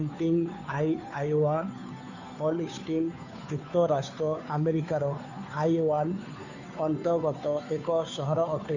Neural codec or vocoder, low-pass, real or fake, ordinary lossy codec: codec, 16 kHz, 2 kbps, FunCodec, trained on Chinese and English, 25 frames a second; 7.2 kHz; fake; none